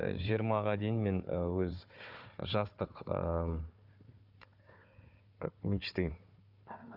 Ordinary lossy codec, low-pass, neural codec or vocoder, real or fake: none; 5.4 kHz; codec, 16 kHz, 4 kbps, FunCodec, trained on Chinese and English, 50 frames a second; fake